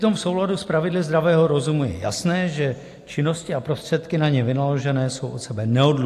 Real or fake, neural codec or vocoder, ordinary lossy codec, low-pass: real; none; AAC, 64 kbps; 14.4 kHz